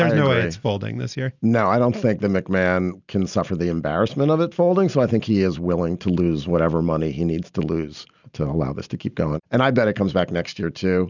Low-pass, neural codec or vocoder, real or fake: 7.2 kHz; none; real